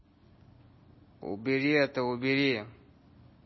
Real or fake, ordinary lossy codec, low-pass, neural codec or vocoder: real; MP3, 24 kbps; 7.2 kHz; none